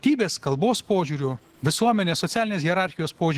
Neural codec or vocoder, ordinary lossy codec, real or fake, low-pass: autoencoder, 48 kHz, 128 numbers a frame, DAC-VAE, trained on Japanese speech; Opus, 16 kbps; fake; 14.4 kHz